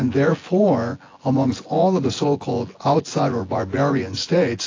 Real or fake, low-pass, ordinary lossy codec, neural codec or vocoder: fake; 7.2 kHz; AAC, 32 kbps; vocoder, 24 kHz, 100 mel bands, Vocos